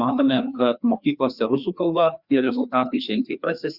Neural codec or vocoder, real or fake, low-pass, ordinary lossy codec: codec, 16 kHz, 2 kbps, FreqCodec, larger model; fake; 5.4 kHz; Opus, 64 kbps